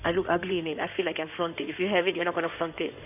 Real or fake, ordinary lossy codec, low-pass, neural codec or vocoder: fake; none; 3.6 kHz; codec, 16 kHz in and 24 kHz out, 2.2 kbps, FireRedTTS-2 codec